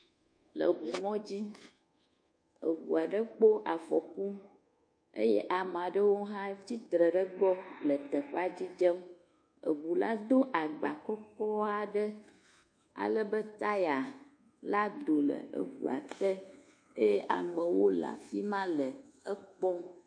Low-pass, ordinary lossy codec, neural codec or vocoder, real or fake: 9.9 kHz; MP3, 48 kbps; codec, 24 kHz, 1.2 kbps, DualCodec; fake